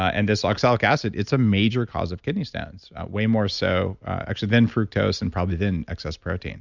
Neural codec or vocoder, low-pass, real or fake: none; 7.2 kHz; real